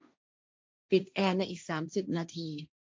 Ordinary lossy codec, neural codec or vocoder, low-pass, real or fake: none; codec, 16 kHz, 1.1 kbps, Voila-Tokenizer; none; fake